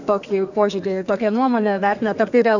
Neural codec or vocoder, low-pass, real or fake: codec, 32 kHz, 1.9 kbps, SNAC; 7.2 kHz; fake